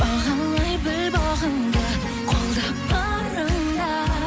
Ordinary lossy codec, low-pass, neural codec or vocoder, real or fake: none; none; none; real